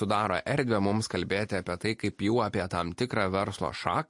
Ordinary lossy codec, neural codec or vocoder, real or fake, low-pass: MP3, 48 kbps; none; real; 10.8 kHz